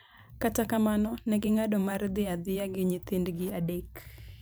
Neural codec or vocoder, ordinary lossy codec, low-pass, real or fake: vocoder, 44.1 kHz, 128 mel bands every 512 samples, BigVGAN v2; none; none; fake